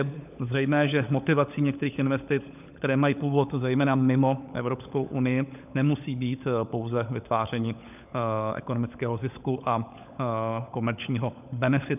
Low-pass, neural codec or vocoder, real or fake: 3.6 kHz; codec, 16 kHz, 16 kbps, FunCodec, trained on LibriTTS, 50 frames a second; fake